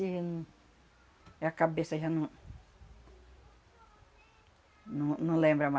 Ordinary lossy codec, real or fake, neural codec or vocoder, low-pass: none; real; none; none